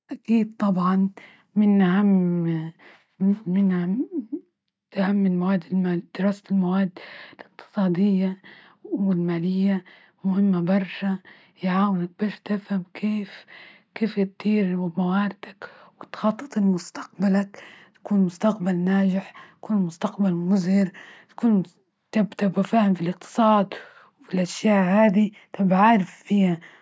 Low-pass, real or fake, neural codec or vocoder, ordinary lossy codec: none; real; none; none